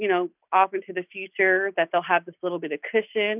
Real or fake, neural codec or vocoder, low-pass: real; none; 3.6 kHz